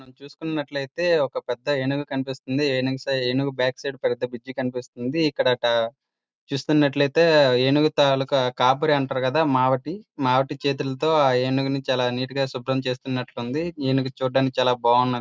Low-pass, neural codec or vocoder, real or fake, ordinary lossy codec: none; none; real; none